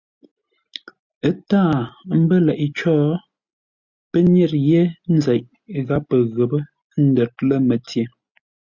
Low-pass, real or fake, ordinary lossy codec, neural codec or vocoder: 7.2 kHz; real; Opus, 64 kbps; none